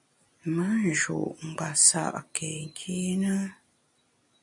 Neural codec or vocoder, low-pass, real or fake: none; 10.8 kHz; real